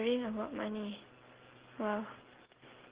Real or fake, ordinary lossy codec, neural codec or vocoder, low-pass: real; Opus, 16 kbps; none; 3.6 kHz